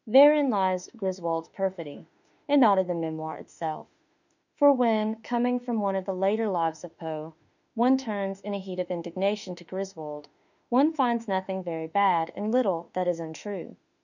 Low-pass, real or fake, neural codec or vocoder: 7.2 kHz; fake; autoencoder, 48 kHz, 32 numbers a frame, DAC-VAE, trained on Japanese speech